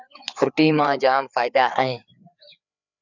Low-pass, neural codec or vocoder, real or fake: 7.2 kHz; codec, 16 kHz, 4 kbps, FreqCodec, larger model; fake